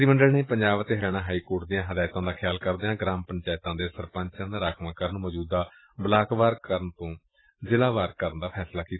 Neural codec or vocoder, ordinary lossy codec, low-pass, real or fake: none; AAC, 16 kbps; 7.2 kHz; real